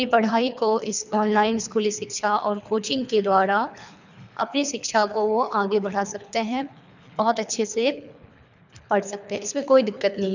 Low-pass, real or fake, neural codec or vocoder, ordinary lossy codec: 7.2 kHz; fake; codec, 24 kHz, 3 kbps, HILCodec; none